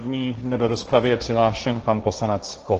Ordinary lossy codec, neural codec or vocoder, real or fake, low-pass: Opus, 16 kbps; codec, 16 kHz, 1.1 kbps, Voila-Tokenizer; fake; 7.2 kHz